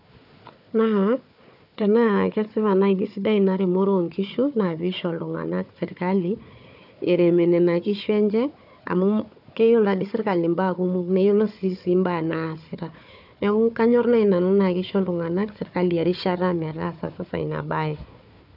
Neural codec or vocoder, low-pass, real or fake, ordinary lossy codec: codec, 16 kHz, 4 kbps, FunCodec, trained on Chinese and English, 50 frames a second; 5.4 kHz; fake; none